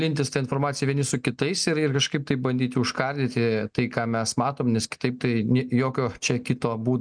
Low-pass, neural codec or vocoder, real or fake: 9.9 kHz; none; real